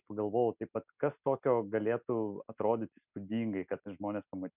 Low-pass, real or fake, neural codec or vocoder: 3.6 kHz; real; none